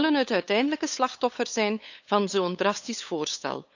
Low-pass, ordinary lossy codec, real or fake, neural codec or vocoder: 7.2 kHz; none; fake; codec, 16 kHz, 8 kbps, FunCodec, trained on LibriTTS, 25 frames a second